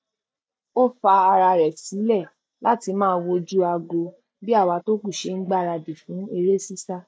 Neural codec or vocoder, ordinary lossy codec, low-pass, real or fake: none; none; none; real